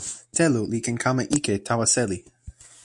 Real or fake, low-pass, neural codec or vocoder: real; 10.8 kHz; none